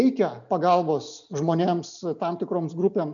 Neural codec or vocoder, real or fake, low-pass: none; real; 7.2 kHz